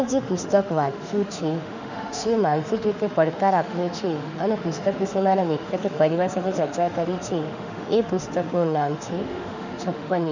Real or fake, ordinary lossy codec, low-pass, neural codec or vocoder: fake; none; 7.2 kHz; autoencoder, 48 kHz, 32 numbers a frame, DAC-VAE, trained on Japanese speech